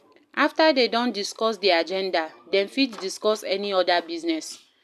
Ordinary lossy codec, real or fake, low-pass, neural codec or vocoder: none; real; 14.4 kHz; none